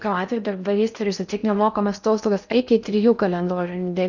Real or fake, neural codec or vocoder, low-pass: fake; codec, 16 kHz in and 24 kHz out, 0.6 kbps, FocalCodec, streaming, 4096 codes; 7.2 kHz